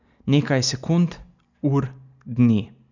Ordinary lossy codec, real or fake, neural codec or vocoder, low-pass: none; real; none; 7.2 kHz